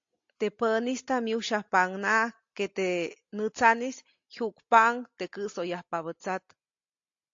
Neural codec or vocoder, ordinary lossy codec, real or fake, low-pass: none; AAC, 48 kbps; real; 7.2 kHz